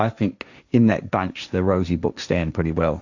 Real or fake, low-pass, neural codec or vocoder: fake; 7.2 kHz; codec, 16 kHz, 1.1 kbps, Voila-Tokenizer